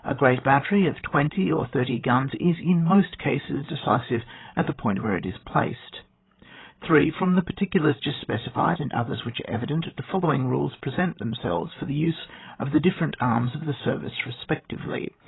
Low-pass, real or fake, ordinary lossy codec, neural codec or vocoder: 7.2 kHz; fake; AAC, 16 kbps; codec, 16 kHz, 16 kbps, FreqCodec, larger model